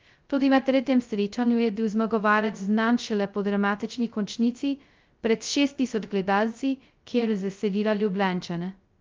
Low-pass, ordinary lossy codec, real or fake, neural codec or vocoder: 7.2 kHz; Opus, 24 kbps; fake; codec, 16 kHz, 0.2 kbps, FocalCodec